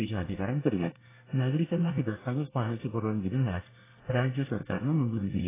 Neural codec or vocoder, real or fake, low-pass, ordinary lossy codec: codec, 24 kHz, 1 kbps, SNAC; fake; 3.6 kHz; AAC, 16 kbps